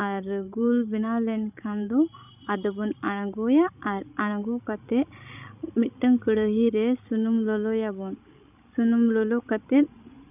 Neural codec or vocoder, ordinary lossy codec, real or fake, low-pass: codec, 16 kHz, 16 kbps, FreqCodec, larger model; none; fake; 3.6 kHz